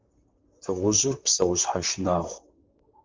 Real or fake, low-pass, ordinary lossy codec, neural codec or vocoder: fake; 7.2 kHz; Opus, 32 kbps; vocoder, 44.1 kHz, 128 mel bands, Pupu-Vocoder